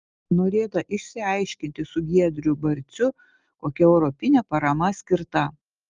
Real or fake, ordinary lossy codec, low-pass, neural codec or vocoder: real; Opus, 32 kbps; 7.2 kHz; none